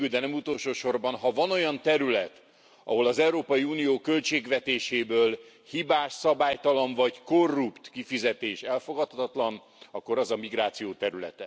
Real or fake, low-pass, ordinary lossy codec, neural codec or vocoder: real; none; none; none